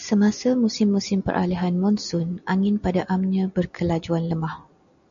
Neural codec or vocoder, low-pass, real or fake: none; 7.2 kHz; real